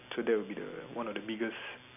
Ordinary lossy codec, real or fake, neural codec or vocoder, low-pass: none; real; none; 3.6 kHz